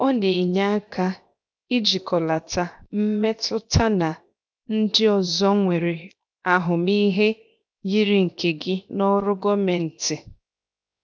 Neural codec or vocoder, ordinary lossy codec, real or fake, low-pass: codec, 16 kHz, 0.7 kbps, FocalCodec; none; fake; none